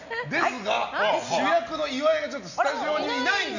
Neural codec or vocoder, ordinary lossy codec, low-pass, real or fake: none; none; 7.2 kHz; real